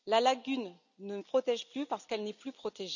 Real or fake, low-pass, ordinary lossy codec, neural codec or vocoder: real; 7.2 kHz; none; none